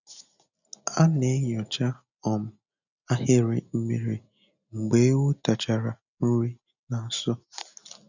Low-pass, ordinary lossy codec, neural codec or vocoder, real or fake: 7.2 kHz; none; none; real